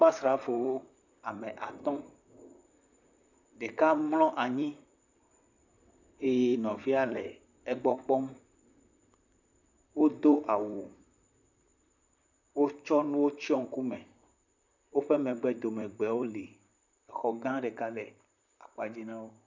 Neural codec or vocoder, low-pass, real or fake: vocoder, 44.1 kHz, 128 mel bands, Pupu-Vocoder; 7.2 kHz; fake